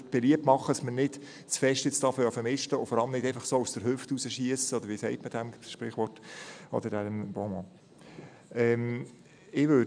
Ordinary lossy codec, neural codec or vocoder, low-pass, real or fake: none; none; 9.9 kHz; real